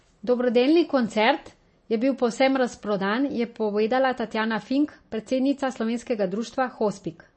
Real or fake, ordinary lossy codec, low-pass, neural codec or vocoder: real; MP3, 32 kbps; 9.9 kHz; none